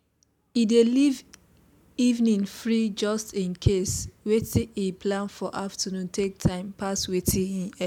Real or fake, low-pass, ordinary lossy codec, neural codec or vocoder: real; 19.8 kHz; none; none